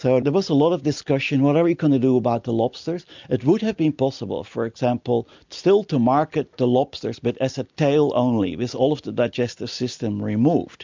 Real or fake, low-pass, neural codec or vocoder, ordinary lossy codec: real; 7.2 kHz; none; MP3, 64 kbps